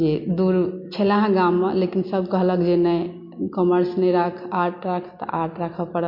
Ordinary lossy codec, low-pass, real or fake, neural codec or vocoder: MP3, 32 kbps; 5.4 kHz; real; none